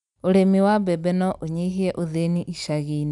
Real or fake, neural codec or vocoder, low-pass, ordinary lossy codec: real; none; 10.8 kHz; none